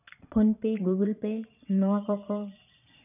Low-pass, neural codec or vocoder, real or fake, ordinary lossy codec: 3.6 kHz; vocoder, 22.05 kHz, 80 mel bands, Vocos; fake; none